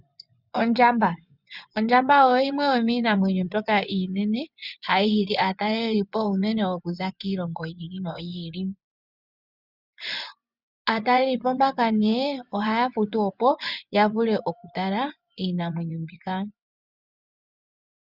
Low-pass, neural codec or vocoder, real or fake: 5.4 kHz; vocoder, 24 kHz, 100 mel bands, Vocos; fake